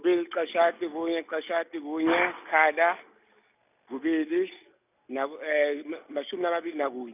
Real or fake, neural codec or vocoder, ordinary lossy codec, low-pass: real; none; none; 3.6 kHz